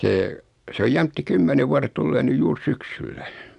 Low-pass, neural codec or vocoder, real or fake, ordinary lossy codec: 10.8 kHz; none; real; none